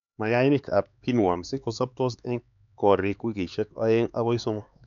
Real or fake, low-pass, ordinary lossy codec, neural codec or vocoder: fake; 7.2 kHz; none; codec, 16 kHz, 4 kbps, X-Codec, HuBERT features, trained on LibriSpeech